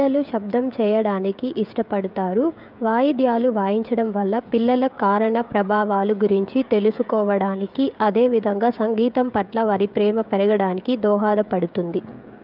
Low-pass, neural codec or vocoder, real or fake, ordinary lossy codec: 5.4 kHz; codec, 16 kHz, 16 kbps, FreqCodec, smaller model; fake; none